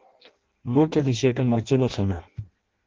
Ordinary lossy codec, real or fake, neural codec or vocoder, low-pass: Opus, 16 kbps; fake; codec, 16 kHz in and 24 kHz out, 0.6 kbps, FireRedTTS-2 codec; 7.2 kHz